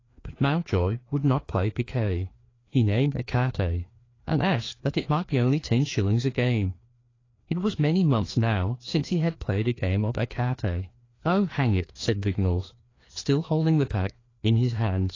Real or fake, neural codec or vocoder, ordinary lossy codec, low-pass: fake; codec, 16 kHz, 2 kbps, FreqCodec, larger model; AAC, 32 kbps; 7.2 kHz